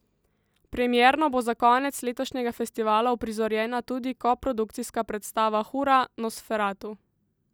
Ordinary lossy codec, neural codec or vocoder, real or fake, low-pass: none; none; real; none